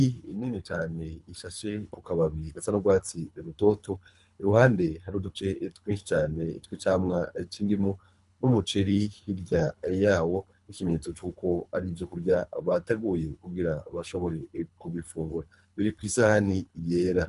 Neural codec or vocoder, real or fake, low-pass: codec, 24 kHz, 3 kbps, HILCodec; fake; 10.8 kHz